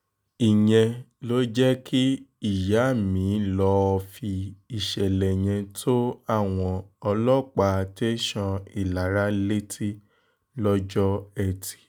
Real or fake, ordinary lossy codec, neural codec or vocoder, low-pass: real; none; none; none